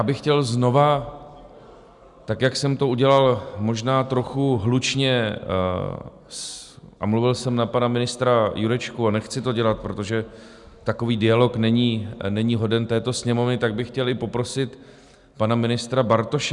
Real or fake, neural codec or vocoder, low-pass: real; none; 10.8 kHz